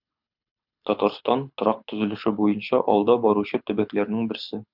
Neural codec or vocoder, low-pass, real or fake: codec, 24 kHz, 6 kbps, HILCodec; 5.4 kHz; fake